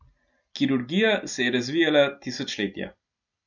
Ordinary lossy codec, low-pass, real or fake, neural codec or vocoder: none; 7.2 kHz; real; none